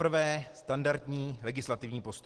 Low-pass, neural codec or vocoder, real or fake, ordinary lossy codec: 10.8 kHz; none; real; Opus, 16 kbps